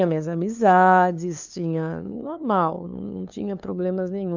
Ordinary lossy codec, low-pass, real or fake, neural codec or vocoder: none; 7.2 kHz; fake; codec, 16 kHz, 2 kbps, FunCodec, trained on LibriTTS, 25 frames a second